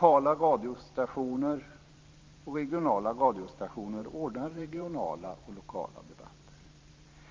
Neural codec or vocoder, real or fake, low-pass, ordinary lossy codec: vocoder, 44.1 kHz, 128 mel bands every 512 samples, BigVGAN v2; fake; 7.2 kHz; Opus, 24 kbps